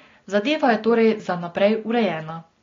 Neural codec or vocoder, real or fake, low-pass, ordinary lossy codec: none; real; 7.2 kHz; AAC, 32 kbps